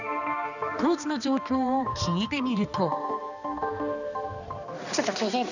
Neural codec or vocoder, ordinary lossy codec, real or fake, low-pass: codec, 16 kHz, 2 kbps, X-Codec, HuBERT features, trained on balanced general audio; none; fake; 7.2 kHz